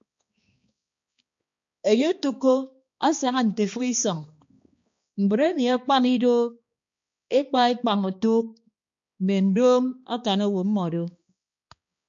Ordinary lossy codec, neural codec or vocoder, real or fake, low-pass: MP3, 48 kbps; codec, 16 kHz, 2 kbps, X-Codec, HuBERT features, trained on balanced general audio; fake; 7.2 kHz